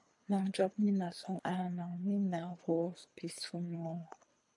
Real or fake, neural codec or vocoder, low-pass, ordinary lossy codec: fake; codec, 24 kHz, 3 kbps, HILCodec; 10.8 kHz; MP3, 64 kbps